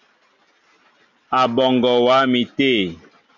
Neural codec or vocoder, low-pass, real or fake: none; 7.2 kHz; real